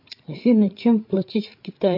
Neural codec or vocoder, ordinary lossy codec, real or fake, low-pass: codec, 16 kHz, 8 kbps, FreqCodec, larger model; MP3, 32 kbps; fake; 5.4 kHz